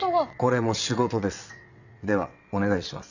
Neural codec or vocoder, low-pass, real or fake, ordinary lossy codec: vocoder, 22.05 kHz, 80 mel bands, WaveNeXt; 7.2 kHz; fake; none